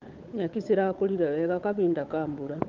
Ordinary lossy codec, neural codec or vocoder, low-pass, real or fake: Opus, 24 kbps; codec, 16 kHz, 8 kbps, FunCodec, trained on Chinese and English, 25 frames a second; 7.2 kHz; fake